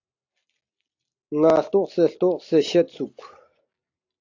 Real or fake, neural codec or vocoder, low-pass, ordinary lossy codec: real; none; 7.2 kHz; AAC, 48 kbps